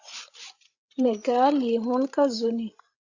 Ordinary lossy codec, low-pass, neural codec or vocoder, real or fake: Opus, 64 kbps; 7.2 kHz; codec, 16 kHz, 16 kbps, FreqCodec, larger model; fake